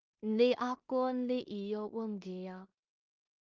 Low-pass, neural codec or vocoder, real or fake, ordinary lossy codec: 7.2 kHz; codec, 16 kHz in and 24 kHz out, 0.4 kbps, LongCat-Audio-Codec, two codebook decoder; fake; Opus, 32 kbps